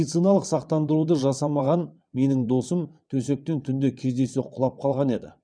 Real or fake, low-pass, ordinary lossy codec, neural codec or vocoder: fake; 9.9 kHz; none; vocoder, 22.05 kHz, 80 mel bands, WaveNeXt